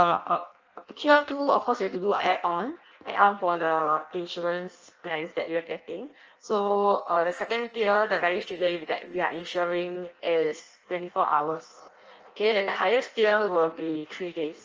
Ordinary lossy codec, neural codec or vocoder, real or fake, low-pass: Opus, 24 kbps; codec, 16 kHz in and 24 kHz out, 0.6 kbps, FireRedTTS-2 codec; fake; 7.2 kHz